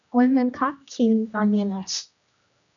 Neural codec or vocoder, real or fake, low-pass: codec, 16 kHz, 1 kbps, X-Codec, HuBERT features, trained on general audio; fake; 7.2 kHz